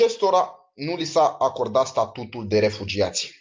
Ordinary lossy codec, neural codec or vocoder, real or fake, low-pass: Opus, 24 kbps; none; real; 7.2 kHz